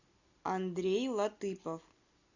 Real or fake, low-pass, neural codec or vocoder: real; 7.2 kHz; none